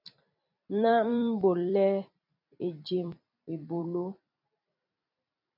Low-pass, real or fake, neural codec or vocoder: 5.4 kHz; fake; vocoder, 44.1 kHz, 128 mel bands every 256 samples, BigVGAN v2